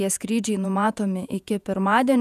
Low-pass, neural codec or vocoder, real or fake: 14.4 kHz; vocoder, 48 kHz, 128 mel bands, Vocos; fake